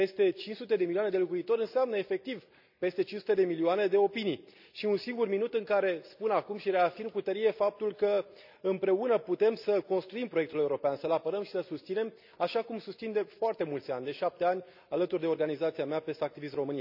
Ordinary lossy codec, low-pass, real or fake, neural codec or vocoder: none; 5.4 kHz; real; none